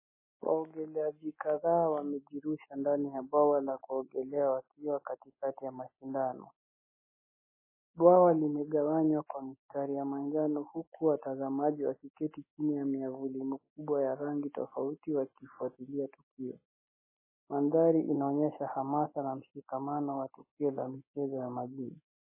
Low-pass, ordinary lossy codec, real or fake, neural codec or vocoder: 3.6 kHz; MP3, 16 kbps; real; none